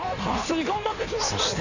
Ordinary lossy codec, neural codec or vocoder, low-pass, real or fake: none; codec, 16 kHz in and 24 kHz out, 1.1 kbps, FireRedTTS-2 codec; 7.2 kHz; fake